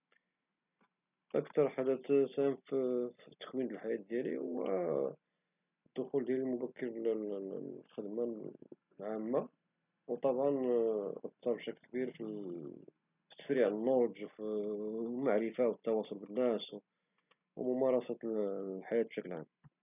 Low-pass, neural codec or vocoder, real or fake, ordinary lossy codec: 3.6 kHz; none; real; none